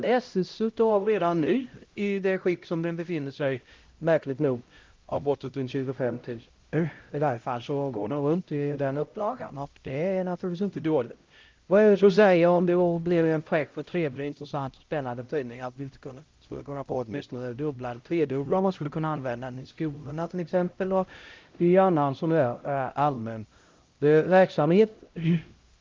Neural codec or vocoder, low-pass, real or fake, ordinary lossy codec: codec, 16 kHz, 0.5 kbps, X-Codec, HuBERT features, trained on LibriSpeech; 7.2 kHz; fake; Opus, 32 kbps